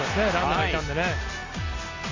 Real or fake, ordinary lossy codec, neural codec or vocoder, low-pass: real; AAC, 32 kbps; none; 7.2 kHz